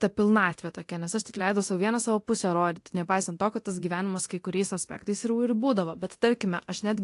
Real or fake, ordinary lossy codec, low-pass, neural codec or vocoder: fake; AAC, 48 kbps; 10.8 kHz; codec, 24 kHz, 0.9 kbps, DualCodec